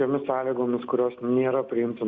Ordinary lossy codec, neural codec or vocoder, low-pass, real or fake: Opus, 64 kbps; none; 7.2 kHz; real